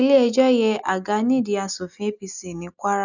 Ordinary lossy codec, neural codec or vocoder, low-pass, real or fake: none; none; 7.2 kHz; real